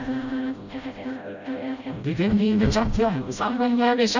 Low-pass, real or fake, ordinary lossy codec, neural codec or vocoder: 7.2 kHz; fake; none; codec, 16 kHz, 0.5 kbps, FreqCodec, smaller model